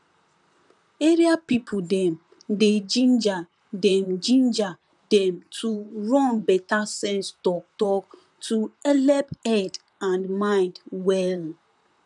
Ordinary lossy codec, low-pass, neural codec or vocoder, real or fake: none; 10.8 kHz; vocoder, 44.1 kHz, 128 mel bands, Pupu-Vocoder; fake